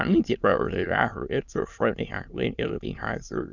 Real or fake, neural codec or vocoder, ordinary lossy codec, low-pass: fake; autoencoder, 22.05 kHz, a latent of 192 numbers a frame, VITS, trained on many speakers; none; 7.2 kHz